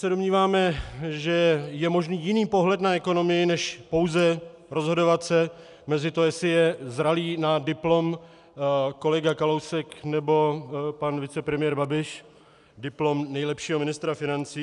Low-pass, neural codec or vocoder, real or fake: 10.8 kHz; none; real